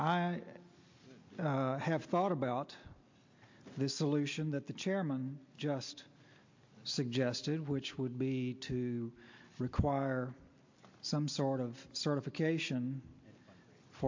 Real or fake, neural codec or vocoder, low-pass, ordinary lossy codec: real; none; 7.2 kHz; MP3, 48 kbps